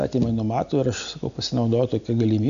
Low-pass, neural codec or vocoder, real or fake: 7.2 kHz; none; real